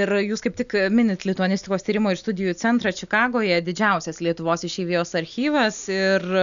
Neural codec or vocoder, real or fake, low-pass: none; real; 7.2 kHz